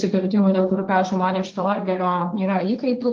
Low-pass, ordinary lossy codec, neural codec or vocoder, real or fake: 7.2 kHz; Opus, 32 kbps; codec, 16 kHz, 1.1 kbps, Voila-Tokenizer; fake